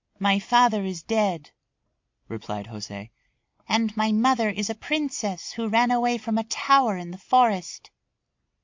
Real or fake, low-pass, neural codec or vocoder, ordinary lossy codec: real; 7.2 kHz; none; MP3, 48 kbps